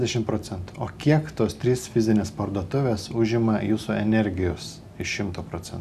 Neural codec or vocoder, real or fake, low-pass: none; real; 14.4 kHz